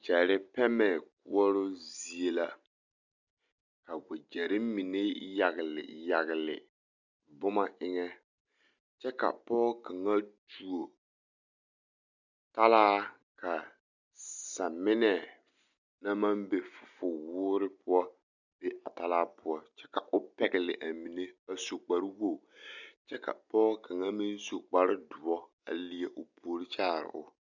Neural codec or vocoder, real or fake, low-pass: none; real; 7.2 kHz